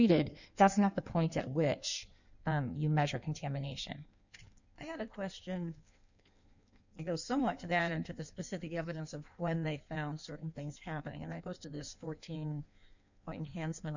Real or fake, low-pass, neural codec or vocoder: fake; 7.2 kHz; codec, 16 kHz in and 24 kHz out, 1.1 kbps, FireRedTTS-2 codec